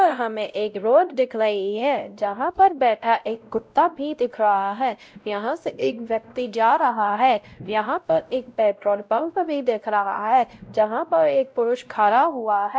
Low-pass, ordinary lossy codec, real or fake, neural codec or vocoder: none; none; fake; codec, 16 kHz, 0.5 kbps, X-Codec, WavLM features, trained on Multilingual LibriSpeech